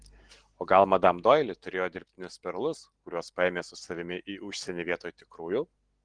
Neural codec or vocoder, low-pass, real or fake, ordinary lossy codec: none; 9.9 kHz; real; Opus, 16 kbps